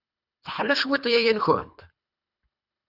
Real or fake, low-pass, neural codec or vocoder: fake; 5.4 kHz; codec, 24 kHz, 3 kbps, HILCodec